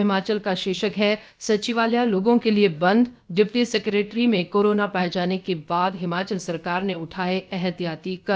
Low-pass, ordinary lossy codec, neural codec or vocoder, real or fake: none; none; codec, 16 kHz, about 1 kbps, DyCAST, with the encoder's durations; fake